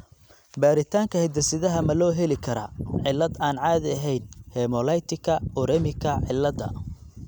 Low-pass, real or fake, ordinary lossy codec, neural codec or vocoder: none; real; none; none